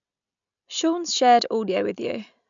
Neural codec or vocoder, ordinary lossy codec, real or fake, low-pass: none; none; real; 7.2 kHz